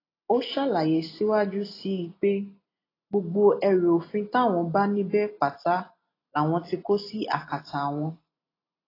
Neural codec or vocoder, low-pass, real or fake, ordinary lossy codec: none; 5.4 kHz; real; AAC, 24 kbps